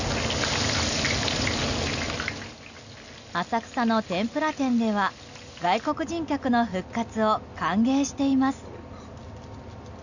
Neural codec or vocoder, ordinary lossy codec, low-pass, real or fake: none; none; 7.2 kHz; real